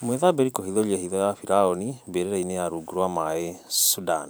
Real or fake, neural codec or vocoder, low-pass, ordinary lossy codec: fake; vocoder, 44.1 kHz, 128 mel bands every 512 samples, BigVGAN v2; none; none